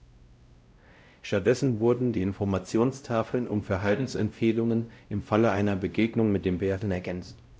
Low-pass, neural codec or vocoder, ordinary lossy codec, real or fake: none; codec, 16 kHz, 0.5 kbps, X-Codec, WavLM features, trained on Multilingual LibriSpeech; none; fake